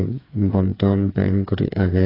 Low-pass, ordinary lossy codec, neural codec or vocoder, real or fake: 5.4 kHz; AAC, 24 kbps; codec, 16 kHz, 4 kbps, FreqCodec, smaller model; fake